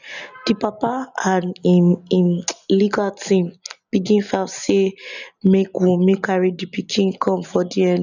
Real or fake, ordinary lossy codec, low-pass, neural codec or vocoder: real; none; 7.2 kHz; none